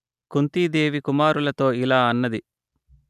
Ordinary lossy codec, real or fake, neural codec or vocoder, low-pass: none; real; none; 14.4 kHz